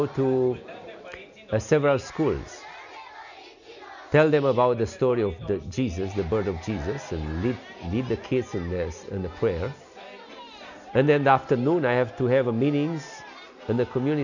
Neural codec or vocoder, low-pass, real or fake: none; 7.2 kHz; real